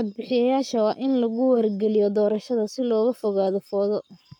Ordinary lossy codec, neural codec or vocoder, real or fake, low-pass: none; codec, 44.1 kHz, 7.8 kbps, Pupu-Codec; fake; 19.8 kHz